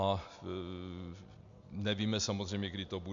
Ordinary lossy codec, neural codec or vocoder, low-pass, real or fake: MP3, 64 kbps; none; 7.2 kHz; real